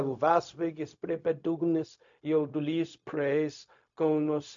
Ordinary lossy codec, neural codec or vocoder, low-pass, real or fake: MP3, 64 kbps; codec, 16 kHz, 0.4 kbps, LongCat-Audio-Codec; 7.2 kHz; fake